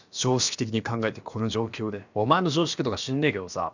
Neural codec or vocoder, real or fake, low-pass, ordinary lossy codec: codec, 16 kHz, about 1 kbps, DyCAST, with the encoder's durations; fake; 7.2 kHz; none